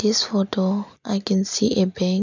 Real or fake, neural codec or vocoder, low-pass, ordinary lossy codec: real; none; 7.2 kHz; none